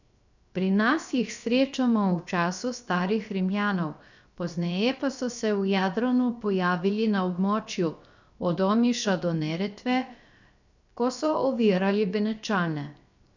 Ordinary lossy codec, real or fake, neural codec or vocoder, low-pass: none; fake; codec, 16 kHz, 0.7 kbps, FocalCodec; 7.2 kHz